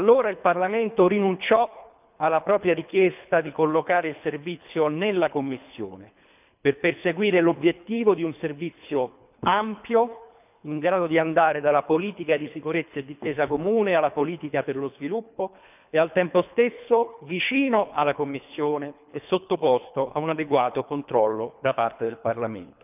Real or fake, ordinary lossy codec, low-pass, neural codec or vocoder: fake; none; 3.6 kHz; codec, 24 kHz, 3 kbps, HILCodec